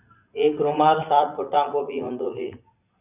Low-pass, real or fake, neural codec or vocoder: 3.6 kHz; fake; vocoder, 44.1 kHz, 80 mel bands, Vocos